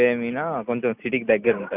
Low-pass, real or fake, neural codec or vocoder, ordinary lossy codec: 3.6 kHz; real; none; none